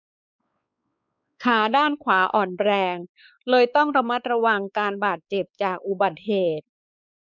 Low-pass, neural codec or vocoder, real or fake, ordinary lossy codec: 7.2 kHz; codec, 16 kHz, 4 kbps, X-Codec, WavLM features, trained on Multilingual LibriSpeech; fake; none